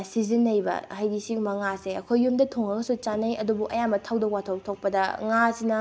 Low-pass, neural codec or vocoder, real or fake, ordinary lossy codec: none; none; real; none